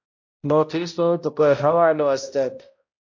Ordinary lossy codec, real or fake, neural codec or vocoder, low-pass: MP3, 48 kbps; fake; codec, 16 kHz, 0.5 kbps, X-Codec, HuBERT features, trained on balanced general audio; 7.2 kHz